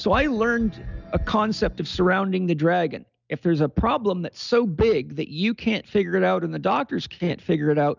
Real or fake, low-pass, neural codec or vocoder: real; 7.2 kHz; none